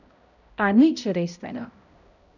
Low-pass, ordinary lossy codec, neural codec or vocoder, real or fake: 7.2 kHz; none; codec, 16 kHz, 0.5 kbps, X-Codec, HuBERT features, trained on balanced general audio; fake